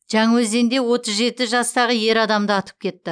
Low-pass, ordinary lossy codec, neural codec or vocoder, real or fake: 9.9 kHz; none; none; real